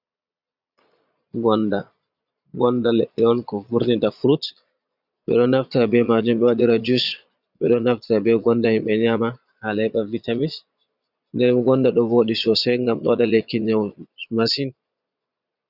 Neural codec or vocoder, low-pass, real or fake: vocoder, 22.05 kHz, 80 mel bands, Vocos; 5.4 kHz; fake